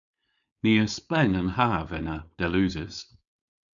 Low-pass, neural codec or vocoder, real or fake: 7.2 kHz; codec, 16 kHz, 4.8 kbps, FACodec; fake